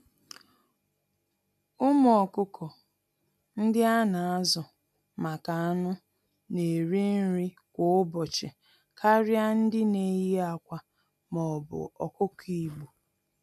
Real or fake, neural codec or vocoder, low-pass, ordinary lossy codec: real; none; 14.4 kHz; none